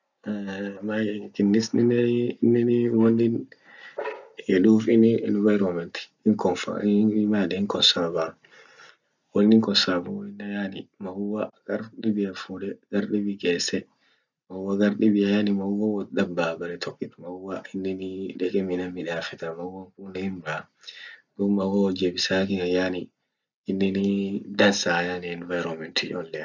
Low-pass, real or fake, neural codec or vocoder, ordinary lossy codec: 7.2 kHz; real; none; none